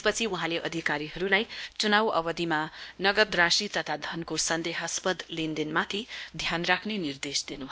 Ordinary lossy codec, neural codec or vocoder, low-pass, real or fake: none; codec, 16 kHz, 1 kbps, X-Codec, WavLM features, trained on Multilingual LibriSpeech; none; fake